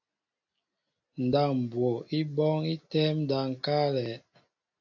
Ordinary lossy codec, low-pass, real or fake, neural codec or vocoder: AAC, 48 kbps; 7.2 kHz; real; none